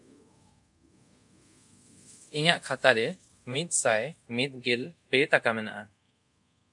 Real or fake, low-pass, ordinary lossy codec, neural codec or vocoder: fake; 10.8 kHz; MP3, 64 kbps; codec, 24 kHz, 0.5 kbps, DualCodec